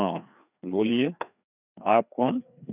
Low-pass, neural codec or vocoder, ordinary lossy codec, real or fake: 3.6 kHz; codec, 16 kHz, 2 kbps, FreqCodec, larger model; none; fake